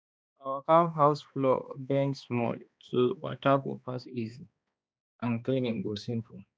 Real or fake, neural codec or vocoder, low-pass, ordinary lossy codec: fake; codec, 16 kHz, 2 kbps, X-Codec, HuBERT features, trained on balanced general audio; none; none